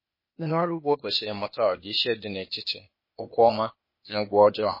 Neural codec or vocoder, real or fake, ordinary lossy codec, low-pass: codec, 16 kHz, 0.8 kbps, ZipCodec; fake; MP3, 24 kbps; 5.4 kHz